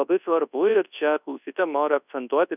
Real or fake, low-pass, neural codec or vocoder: fake; 3.6 kHz; codec, 24 kHz, 0.9 kbps, WavTokenizer, large speech release